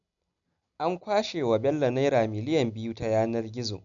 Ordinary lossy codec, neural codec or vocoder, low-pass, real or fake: none; none; 7.2 kHz; real